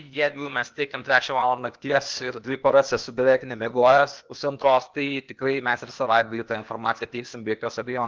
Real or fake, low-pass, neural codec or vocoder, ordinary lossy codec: fake; 7.2 kHz; codec, 16 kHz, 0.8 kbps, ZipCodec; Opus, 24 kbps